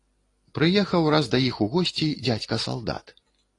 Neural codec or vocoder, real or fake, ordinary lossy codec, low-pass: none; real; AAC, 32 kbps; 10.8 kHz